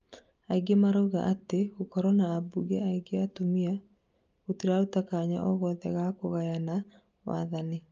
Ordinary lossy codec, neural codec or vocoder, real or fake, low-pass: Opus, 24 kbps; none; real; 7.2 kHz